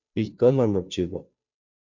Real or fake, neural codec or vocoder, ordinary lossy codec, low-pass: fake; codec, 16 kHz, 0.5 kbps, FunCodec, trained on Chinese and English, 25 frames a second; MP3, 48 kbps; 7.2 kHz